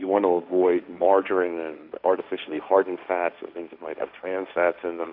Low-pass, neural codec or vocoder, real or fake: 5.4 kHz; codec, 16 kHz, 1.1 kbps, Voila-Tokenizer; fake